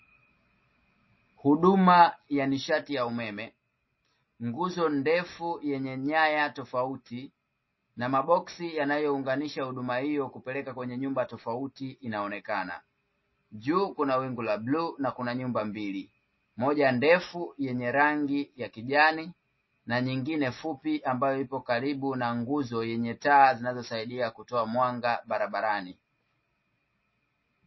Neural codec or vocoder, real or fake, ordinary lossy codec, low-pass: none; real; MP3, 24 kbps; 7.2 kHz